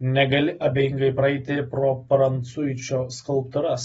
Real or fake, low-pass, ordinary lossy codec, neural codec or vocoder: real; 10.8 kHz; AAC, 24 kbps; none